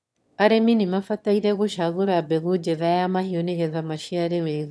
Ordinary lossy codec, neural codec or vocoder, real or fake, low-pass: none; autoencoder, 22.05 kHz, a latent of 192 numbers a frame, VITS, trained on one speaker; fake; none